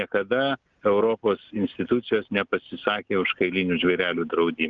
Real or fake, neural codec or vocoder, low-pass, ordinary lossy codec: real; none; 7.2 kHz; Opus, 24 kbps